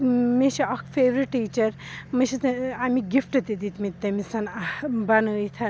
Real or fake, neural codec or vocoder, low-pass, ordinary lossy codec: real; none; none; none